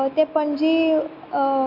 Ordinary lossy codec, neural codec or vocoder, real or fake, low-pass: MP3, 32 kbps; none; real; 5.4 kHz